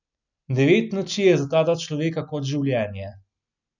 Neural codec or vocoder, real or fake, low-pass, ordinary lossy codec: none; real; 7.2 kHz; none